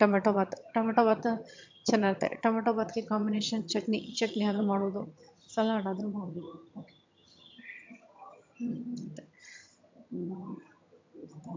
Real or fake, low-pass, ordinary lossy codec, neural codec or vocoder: fake; 7.2 kHz; MP3, 64 kbps; vocoder, 22.05 kHz, 80 mel bands, HiFi-GAN